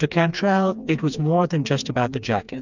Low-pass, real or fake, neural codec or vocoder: 7.2 kHz; fake; codec, 16 kHz, 2 kbps, FreqCodec, smaller model